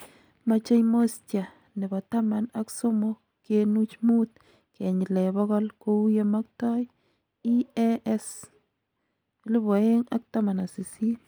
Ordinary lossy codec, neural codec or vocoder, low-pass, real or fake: none; none; none; real